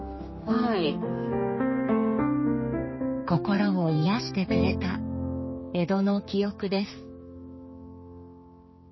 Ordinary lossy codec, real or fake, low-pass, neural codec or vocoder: MP3, 24 kbps; fake; 7.2 kHz; codec, 16 kHz, 4 kbps, X-Codec, HuBERT features, trained on general audio